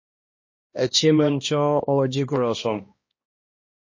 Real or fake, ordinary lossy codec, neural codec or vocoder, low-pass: fake; MP3, 32 kbps; codec, 16 kHz, 1 kbps, X-Codec, HuBERT features, trained on balanced general audio; 7.2 kHz